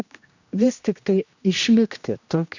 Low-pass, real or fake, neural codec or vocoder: 7.2 kHz; fake; codec, 16 kHz, 1 kbps, X-Codec, HuBERT features, trained on general audio